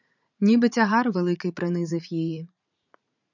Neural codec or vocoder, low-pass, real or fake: vocoder, 44.1 kHz, 80 mel bands, Vocos; 7.2 kHz; fake